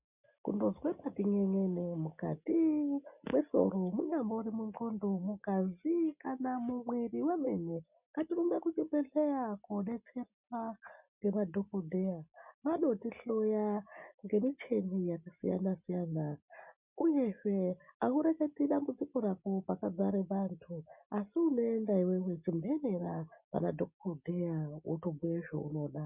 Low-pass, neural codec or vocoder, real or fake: 3.6 kHz; none; real